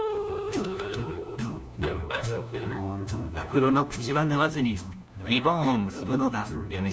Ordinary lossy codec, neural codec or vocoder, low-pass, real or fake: none; codec, 16 kHz, 1 kbps, FunCodec, trained on LibriTTS, 50 frames a second; none; fake